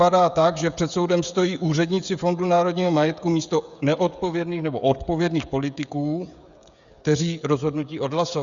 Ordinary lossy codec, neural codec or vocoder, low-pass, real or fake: Opus, 64 kbps; codec, 16 kHz, 16 kbps, FreqCodec, smaller model; 7.2 kHz; fake